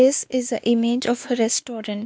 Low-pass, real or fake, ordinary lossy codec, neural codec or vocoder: none; fake; none; codec, 16 kHz, 2 kbps, X-Codec, WavLM features, trained on Multilingual LibriSpeech